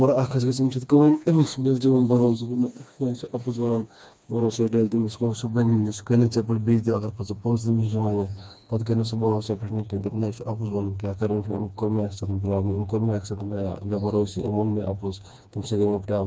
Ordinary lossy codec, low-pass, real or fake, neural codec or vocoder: none; none; fake; codec, 16 kHz, 2 kbps, FreqCodec, smaller model